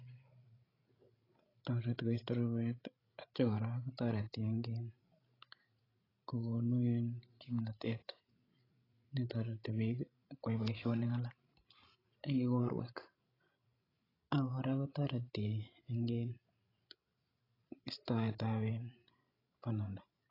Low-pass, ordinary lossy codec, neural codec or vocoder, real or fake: 5.4 kHz; AAC, 24 kbps; codec, 16 kHz, 16 kbps, FreqCodec, larger model; fake